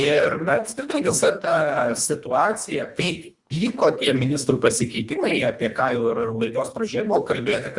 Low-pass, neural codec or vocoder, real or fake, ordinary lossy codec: 10.8 kHz; codec, 24 kHz, 1.5 kbps, HILCodec; fake; Opus, 64 kbps